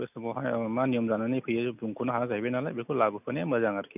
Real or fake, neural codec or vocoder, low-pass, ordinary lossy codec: real; none; 3.6 kHz; none